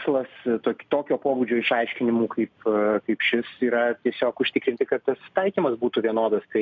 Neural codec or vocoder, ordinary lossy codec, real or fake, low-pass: none; MP3, 64 kbps; real; 7.2 kHz